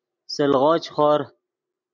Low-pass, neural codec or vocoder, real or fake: 7.2 kHz; none; real